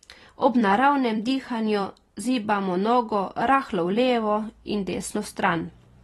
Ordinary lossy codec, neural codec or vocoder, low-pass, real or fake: AAC, 32 kbps; vocoder, 44.1 kHz, 128 mel bands every 256 samples, BigVGAN v2; 19.8 kHz; fake